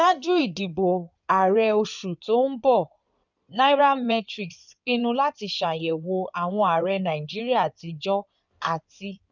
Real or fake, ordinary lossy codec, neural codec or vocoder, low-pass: fake; none; codec, 16 kHz in and 24 kHz out, 2.2 kbps, FireRedTTS-2 codec; 7.2 kHz